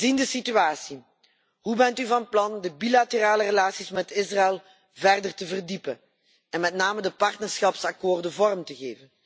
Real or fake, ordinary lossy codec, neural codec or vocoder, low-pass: real; none; none; none